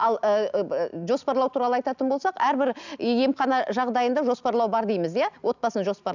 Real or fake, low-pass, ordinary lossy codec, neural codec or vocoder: real; 7.2 kHz; none; none